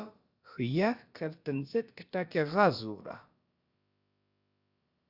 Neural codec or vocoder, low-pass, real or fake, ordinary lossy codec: codec, 16 kHz, about 1 kbps, DyCAST, with the encoder's durations; 5.4 kHz; fake; Opus, 64 kbps